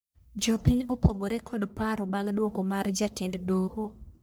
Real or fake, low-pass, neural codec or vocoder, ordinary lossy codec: fake; none; codec, 44.1 kHz, 1.7 kbps, Pupu-Codec; none